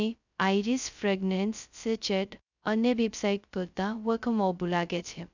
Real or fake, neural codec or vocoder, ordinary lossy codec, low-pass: fake; codec, 16 kHz, 0.2 kbps, FocalCodec; none; 7.2 kHz